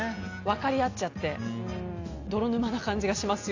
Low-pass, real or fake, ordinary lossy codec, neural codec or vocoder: 7.2 kHz; real; AAC, 48 kbps; none